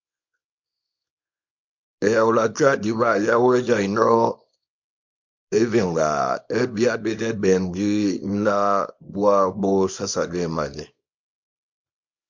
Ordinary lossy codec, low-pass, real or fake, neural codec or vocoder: MP3, 48 kbps; 7.2 kHz; fake; codec, 24 kHz, 0.9 kbps, WavTokenizer, small release